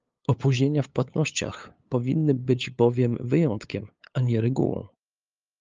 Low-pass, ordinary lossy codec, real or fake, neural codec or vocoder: 7.2 kHz; Opus, 24 kbps; fake; codec, 16 kHz, 8 kbps, FunCodec, trained on LibriTTS, 25 frames a second